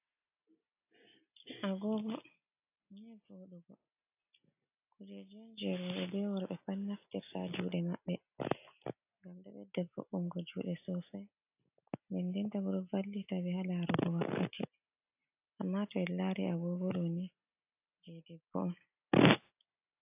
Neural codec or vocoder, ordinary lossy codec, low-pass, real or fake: none; AAC, 32 kbps; 3.6 kHz; real